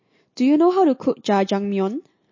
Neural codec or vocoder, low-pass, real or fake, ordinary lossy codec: none; 7.2 kHz; real; MP3, 32 kbps